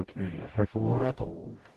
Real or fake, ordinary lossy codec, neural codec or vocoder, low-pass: fake; Opus, 16 kbps; codec, 44.1 kHz, 0.9 kbps, DAC; 14.4 kHz